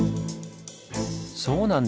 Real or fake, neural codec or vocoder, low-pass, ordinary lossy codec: real; none; none; none